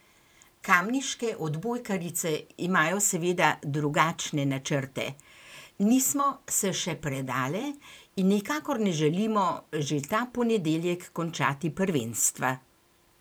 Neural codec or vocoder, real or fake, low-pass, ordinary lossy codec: vocoder, 44.1 kHz, 128 mel bands every 512 samples, BigVGAN v2; fake; none; none